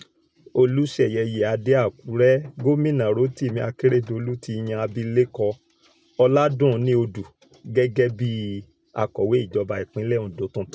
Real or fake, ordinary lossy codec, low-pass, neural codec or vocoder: real; none; none; none